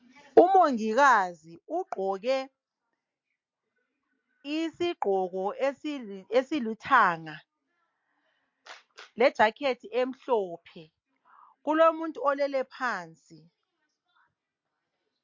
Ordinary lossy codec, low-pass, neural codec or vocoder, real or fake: MP3, 48 kbps; 7.2 kHz; none; real